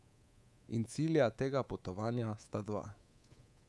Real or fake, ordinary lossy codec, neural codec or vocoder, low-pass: fake; none; codec, 24 kHz, 3.1 kbps, DualCodec; none